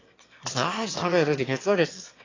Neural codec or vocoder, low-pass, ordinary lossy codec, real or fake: autoencoder, 22.05 kHz, a latent of 192 numbers a frame, VITS, trained on one speaker; 7.2 kHz; AAC, 32 kbps; fake